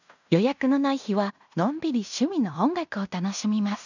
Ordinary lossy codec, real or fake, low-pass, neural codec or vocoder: none; fake; 7.2 kHz; codec, 16 kHz in and 24 kHz out, 0.9 kbps, LongCat-Audio-Codec, fine tuned four codebook decoder